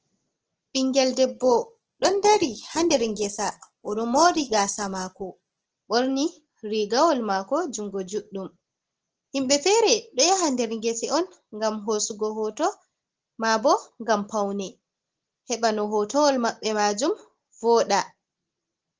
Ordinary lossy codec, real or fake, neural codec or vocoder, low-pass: Opus, 16 kbps; real; none; 7.2 kHz